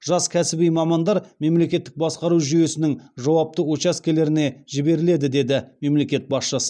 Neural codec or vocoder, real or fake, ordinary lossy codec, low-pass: none; real; none; 9.9 kHz